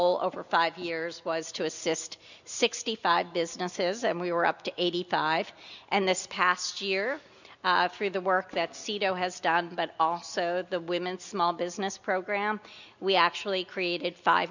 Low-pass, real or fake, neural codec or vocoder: 7.2 kHz; real; none